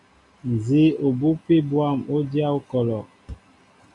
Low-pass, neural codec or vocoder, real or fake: 10.8 kHz; none; real